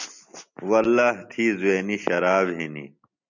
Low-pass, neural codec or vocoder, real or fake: 7.2 kHz; none; real